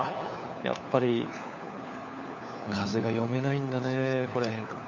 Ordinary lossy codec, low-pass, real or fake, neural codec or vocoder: none; 7.2 kHz; fake; codec, 16 kHz, 4 kbps, FunCodec, trained on LibriTTS, 50 frames a second